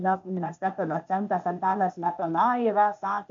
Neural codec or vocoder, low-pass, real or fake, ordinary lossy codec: codec, 16 kHz, 0.8 kbps, ZipCodec; 7.2 kHz; fake; AAC, 64 kbps